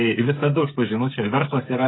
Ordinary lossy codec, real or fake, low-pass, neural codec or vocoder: AAC, 16 kbps; fake; 7.2 kHz; codec, 16 kHz, 16 kbps, FreqCodec, smaller model